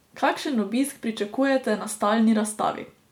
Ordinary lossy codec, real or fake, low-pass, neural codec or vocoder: MP3, 96 kbps; real; 19.8 kHz; none